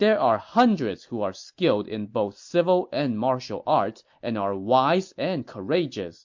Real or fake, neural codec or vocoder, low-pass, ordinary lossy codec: real; none; 7.2 kHz; MP3, 48 kbps